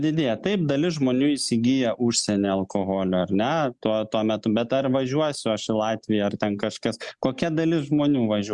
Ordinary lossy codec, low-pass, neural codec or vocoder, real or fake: Opus, 64 kbps; 10.8 kHz; none; real